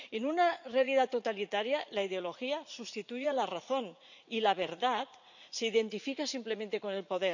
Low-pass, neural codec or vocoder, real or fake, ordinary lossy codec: 7.2 kHz; vocoder, 44.1 kHz, 80 mel bands, Vocos; fake; none